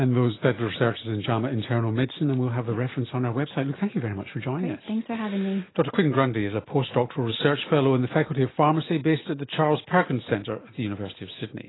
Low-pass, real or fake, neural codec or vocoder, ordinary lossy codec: 7.2 kHz; real; none; AAC, 16 kbps